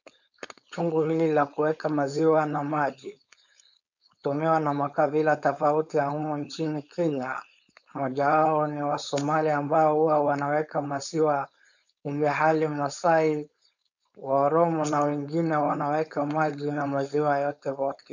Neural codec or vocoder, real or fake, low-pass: codec, 16 kHz, 4.8 kbps, FACodec; fake; 7.2 kHz